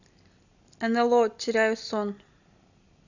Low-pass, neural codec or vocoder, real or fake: 7.2 kHz; none; real